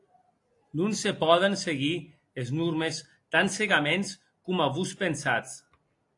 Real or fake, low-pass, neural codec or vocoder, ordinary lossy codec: real; 10.8 kHz; none; AAC, 48 kbps